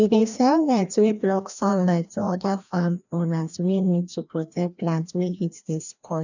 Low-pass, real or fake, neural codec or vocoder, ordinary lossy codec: 7.2 kHz; fake; codec, 16 kHz, 1 kbps, FreqCodec, larger model; none